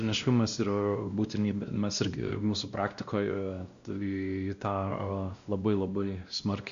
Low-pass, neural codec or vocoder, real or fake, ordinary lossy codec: 7.2 kHz; codec, 16 kHz, 1 kbps, X-Codec, WavLM features, trained on Multilingual LibriSpeech; fake; Opus, 64 kbps